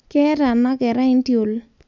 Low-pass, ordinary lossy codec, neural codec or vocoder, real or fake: 7.2 kHz; none; none; real